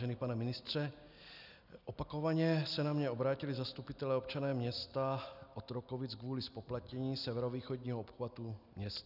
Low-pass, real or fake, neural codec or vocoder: 5.4 kHz; real; none